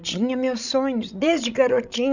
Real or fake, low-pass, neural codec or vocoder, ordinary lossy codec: fake; 7.2 kHz; codec, 16 kHz, 16 kbps, FreqCodec, larger model; none